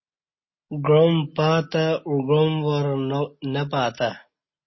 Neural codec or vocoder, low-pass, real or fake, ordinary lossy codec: none; 7.2 kHz; real; MP3, 24 kbps